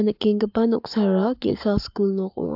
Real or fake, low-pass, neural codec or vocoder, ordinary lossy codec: fake; 5.4 kHz; codec, 44.1 kHz, 7.8 kbps, Pupu-Codec; AAC, 48 kbps